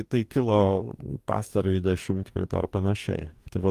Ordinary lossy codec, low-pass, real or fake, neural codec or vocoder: Opus, 32 kbps; 14.4 kHz; fake; codec, 44.1 kHz, 2.6 kbps, DAC